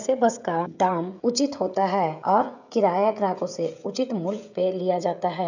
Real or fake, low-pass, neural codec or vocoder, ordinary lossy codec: fake; 7.2 kHz; codec, 16 kHz, 16 kbps, FreqCodec, smaller model; none